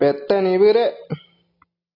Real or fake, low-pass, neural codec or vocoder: real; 5.4 kHz; none